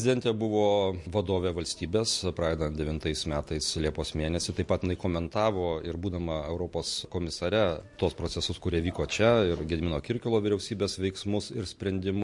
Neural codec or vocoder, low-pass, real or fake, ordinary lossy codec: none; 10.8 kHz; real; MP3, 48 kbps